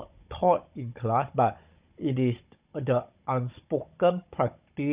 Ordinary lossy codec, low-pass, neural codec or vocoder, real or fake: Opus, 64 kbps; 3.6 kHz; codec, 16 kHz, 16 kbps, FunCodec, trained on Chinese and English, 50 frames a second; fake